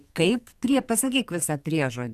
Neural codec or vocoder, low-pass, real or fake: codec, 44.1 kHz, 2.6 kbps, SNAC; 14.4 kHz; fake